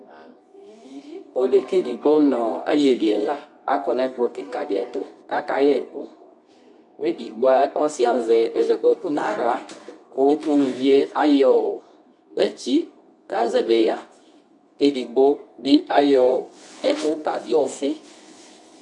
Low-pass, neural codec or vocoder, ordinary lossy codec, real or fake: 10.8 kHz; codec, 24 kHz, 0.9 kbps, WavTokenizer, medium music audio release; AAC, 64 kbps; fake